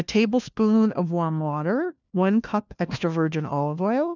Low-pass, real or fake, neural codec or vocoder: 7.2 kHz; fake; codec, 16 kHz, 1 kbps, FunCodec, trained on LibriTTS, 50 frames a second